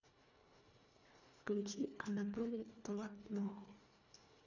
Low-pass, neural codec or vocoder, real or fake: 7.2 kHz; codec, 24 kHz, 1.5 kbps, HILCodec; fake